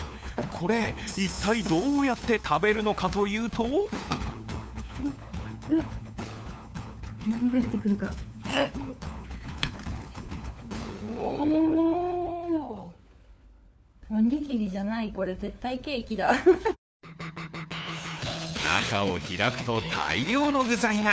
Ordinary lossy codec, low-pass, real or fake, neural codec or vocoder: none; none; fake; codec, 16 kHz, 4 kbps, FunCodec, trained on LibriTTS, 50 frames a second